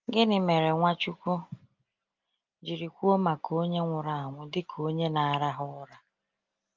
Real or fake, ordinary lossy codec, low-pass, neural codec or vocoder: real; Opus, 32 kbps; 7.2 kHz; none